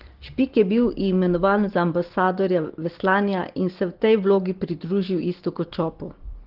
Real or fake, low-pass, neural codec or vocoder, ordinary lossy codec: real; 5.4 kHz; none; Opus, 16 kbps